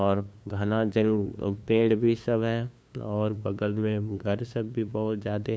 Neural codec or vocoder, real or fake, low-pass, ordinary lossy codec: codec, 16 kHz, 2 kbps, FunCodec, trained on LibriTTS, 25 frames a second; fake; none; none